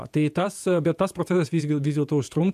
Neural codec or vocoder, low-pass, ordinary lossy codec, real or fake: vocoder, 48 kHz, 128 mel bands, Vocos; 14.4 kHz; MP3, 96 kbps; fake